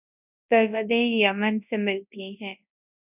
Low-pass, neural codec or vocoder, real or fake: 3.6 kHz; codec, 24 kHz, 0.9 kbps, WavTokenizer, large speech release; fake